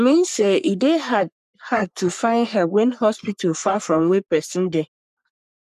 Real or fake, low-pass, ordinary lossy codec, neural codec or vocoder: fake; 14.4 kHz; none; codec, 44.1 kHz, 3.4 kbps, Pupu-Codec